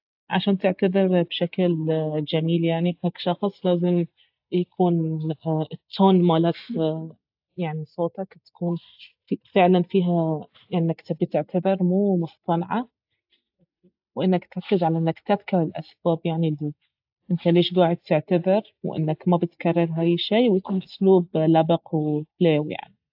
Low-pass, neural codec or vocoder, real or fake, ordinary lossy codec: 5.4 kHz; none; real; AAC, 48 kbps